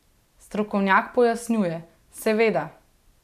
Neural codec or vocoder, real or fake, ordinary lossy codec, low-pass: none; real; none; 14.4 kHz